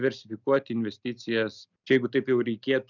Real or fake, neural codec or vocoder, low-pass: real; none; 7.2 kHz